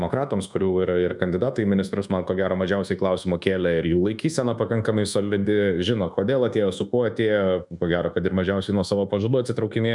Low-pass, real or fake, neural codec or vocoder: 10.8 kHz; fake; codec, 24 kHz, 1.2 kbps, DualCodec